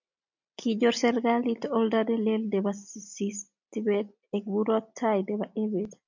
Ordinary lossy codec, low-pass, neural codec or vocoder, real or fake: MP3, 64 kbps; 7.2 kHz; none; real